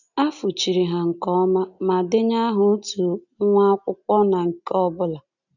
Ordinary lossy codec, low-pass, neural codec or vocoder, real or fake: none; 7.2 kHz; none; real